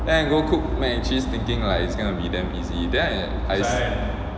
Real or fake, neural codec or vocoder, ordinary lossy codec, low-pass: real; none; none; none